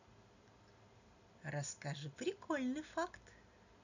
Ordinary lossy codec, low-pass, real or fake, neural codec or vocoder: none; 7.2 kHz; real; none